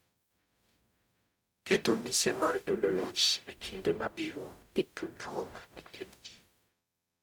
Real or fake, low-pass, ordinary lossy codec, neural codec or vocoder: fake; none; none; codec, 44.1 kHz, 0.9 kbps, DAC